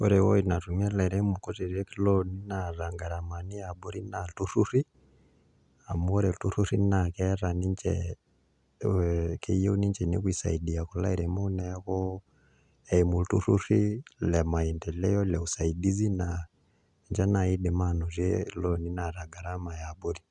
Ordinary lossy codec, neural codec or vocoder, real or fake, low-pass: none; none; real; 10.8 kHz